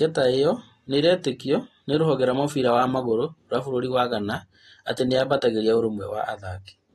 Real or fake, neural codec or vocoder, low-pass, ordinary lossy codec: real; none; 14.4 kHz; AAC, 32 kbps